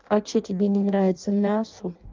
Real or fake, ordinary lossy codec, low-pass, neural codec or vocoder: fake; Opus, 24 kbps; 7.2 kHz; codec, 16 kHz in and 24 kHz out, 0.6 kbps, FireRedTTS-2 codec